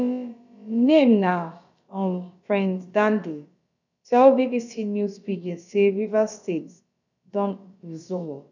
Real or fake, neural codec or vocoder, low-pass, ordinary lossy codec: fake; codec, 16 kHz, about 1 kbps, DyCAST, with the encoder's durations; 7.2 kHz; none